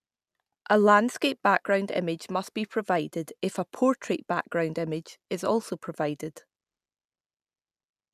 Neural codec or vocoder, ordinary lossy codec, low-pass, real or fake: none; none; 14.4 kHz; real